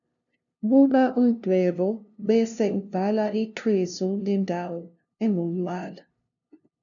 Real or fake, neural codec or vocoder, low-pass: fake; codec, 16 kHz, 0.5 kbps, FunCodec, trained on LibriTTS, 25 frames a second; 7.2 kHz